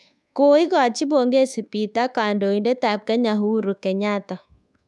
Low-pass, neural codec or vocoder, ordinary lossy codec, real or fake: none; codec, 24 kHz, 1.2 kbps, DualCodec; none; fake